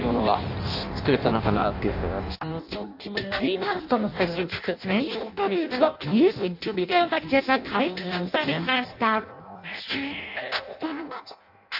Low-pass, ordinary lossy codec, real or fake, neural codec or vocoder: 5.4 kHz; AAC, 48 kbps; fake; codec, 16 kHz in and 24 kHz out, 0.6 kbps, FireRedTTS-2 codec